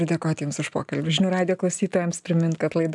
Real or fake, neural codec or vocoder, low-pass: real; none; 10.8 kHz